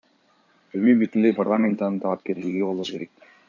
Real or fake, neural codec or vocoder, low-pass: fake; codec, 16 kHz in and 24 kHz out, 2.2 kbps, FireRedTTS-2 codec; 7.2 kHz